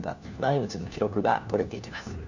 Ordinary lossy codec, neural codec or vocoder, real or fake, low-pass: none; codec, 16 kHz, 1 kbps, FunCodec, trained on LibriTTS, 50 frames a second; fake; 7.2 kHz